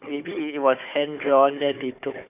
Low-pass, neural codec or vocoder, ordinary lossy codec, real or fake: 3.6 kHz; codec, 16 kHz, 4 kbps, FunCodec, trained on Chinese and English, 50 frames a second; none; fake